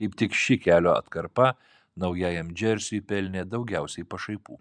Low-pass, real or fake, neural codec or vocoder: 9.9 kHz; real; none